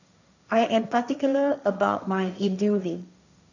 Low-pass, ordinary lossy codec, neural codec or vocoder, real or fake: 7.2 kHz; none; codec, 16 kHz, 1.1 kbps, Voila-Tokenizer; fake